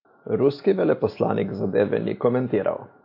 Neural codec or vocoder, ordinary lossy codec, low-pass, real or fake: none; AAC, 32 kbps; 5.4 kHz; real